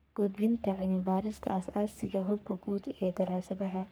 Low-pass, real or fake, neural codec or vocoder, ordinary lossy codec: none; fake; codec, 44.1 kHz, 3.4 kbps, Pupu-Codec; none